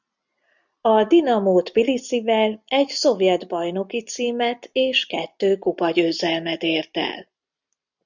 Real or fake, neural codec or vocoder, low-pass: real; none; 7.2 kHz